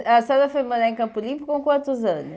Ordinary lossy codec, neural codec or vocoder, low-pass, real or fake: none; none; none; real